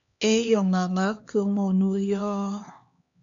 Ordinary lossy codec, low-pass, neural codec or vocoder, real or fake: AAC, 64 kbps; 7.2 kHz; codec, 16 kHz, 2 kbps, X-Codec, HuBERT features, trained on LibriSpeech; fake